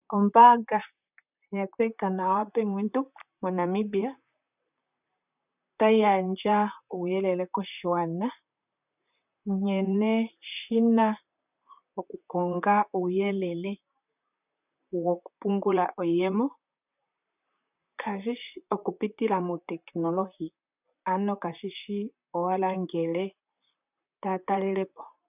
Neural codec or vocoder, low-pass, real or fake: vocoder, 44.1 kHz, 128 mel bands, Pupu-Vocoder; 3.6 kHz; fake